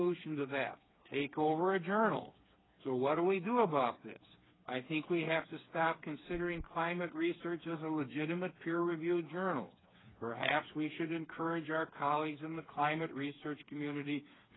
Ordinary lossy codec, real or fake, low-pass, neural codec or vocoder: AAC, 16 kbps; fake; 7.2 kHz; codec, 16 kHz, 4 kbps, FreqCodec, smaller model